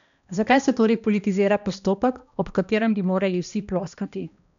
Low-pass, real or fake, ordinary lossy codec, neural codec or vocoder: 7.2 kHz; fake; none; codec, 16 kHz, 1 kbps, X-Codec, HuBERT features, trained on balanced general audio